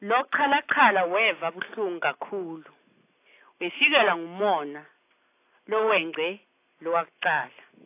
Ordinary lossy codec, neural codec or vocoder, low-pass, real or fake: AAC, 24 kbps; none; 3.6 kHz; real